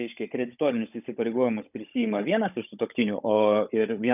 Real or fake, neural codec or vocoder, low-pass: fake; codec, 16 kHz, 16 kbps, FunCodec, trained on Chinese and English, 50 frames a second; 3.6 kHz